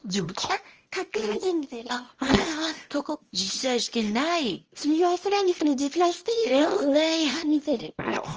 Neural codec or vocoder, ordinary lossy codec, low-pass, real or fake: codec, 24 kHz, 0.9 kbps, WavTokenizer, small release; Opus, 24 kbps; 7.2 kHz; fake